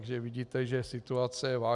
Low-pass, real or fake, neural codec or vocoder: 10.8 kHz; real; none